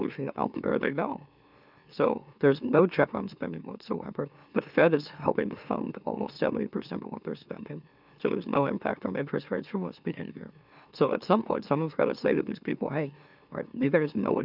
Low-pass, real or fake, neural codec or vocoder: 5.4 kHz; fake; autoencoder, 44.1 kHz, a latent of 192 numbers a frame, MeloTTS